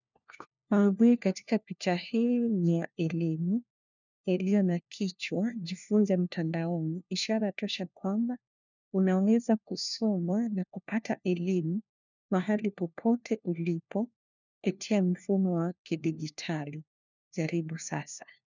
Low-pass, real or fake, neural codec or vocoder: 7.2 kHz; fake; codec, 16 kHz, 1 kbps, FunCodec, trained on LibriTTS, 50 frames a second